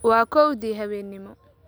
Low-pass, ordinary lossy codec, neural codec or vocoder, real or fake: none; none; none; real